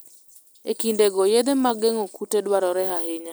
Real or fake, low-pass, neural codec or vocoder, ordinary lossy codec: real; none; none; none